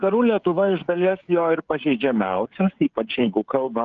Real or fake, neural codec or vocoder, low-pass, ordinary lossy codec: fake; codec, 16 kHz, 16 kbps, FreqCodec, smaller model; 7.2 kHz; Opus, 24 kbps